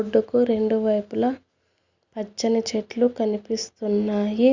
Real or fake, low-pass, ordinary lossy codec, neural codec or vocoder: real; 7.2 kHz; Opus, 64 kbps; none